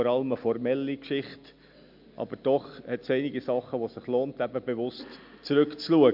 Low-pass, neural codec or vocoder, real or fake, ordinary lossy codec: 5.4 kHz; none; real; MP3, 48 kbps